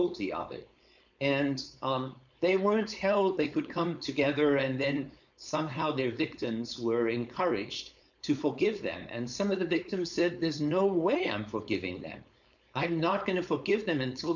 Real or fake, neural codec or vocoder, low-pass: fake; codec, 16 kHz, 4.8 kbps, FACodec; 7.2 kHz